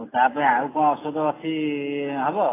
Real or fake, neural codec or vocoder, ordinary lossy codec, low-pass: real; none; AAC, 16 kbps; 3.6 kHz